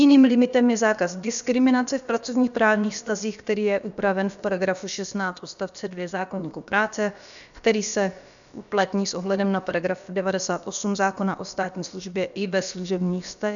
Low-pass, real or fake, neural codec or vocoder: 7.2 kHz; fake; codec, 16 kHz, about 1 kbps, DyCAST, with the encoder's durations